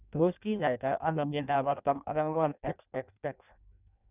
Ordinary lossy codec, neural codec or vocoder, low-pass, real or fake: none; codec, 16 kHz in and 24 kHz out, 0.6 kbps, FireRedTTS-2 codec; 3.6 kHz; fake